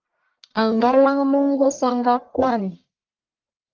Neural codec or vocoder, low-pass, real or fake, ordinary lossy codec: codec, 44.1 kHz, 1.7 kbps, Pupu-Codec; 7.2 kHz; fake; Opus, 32 kbps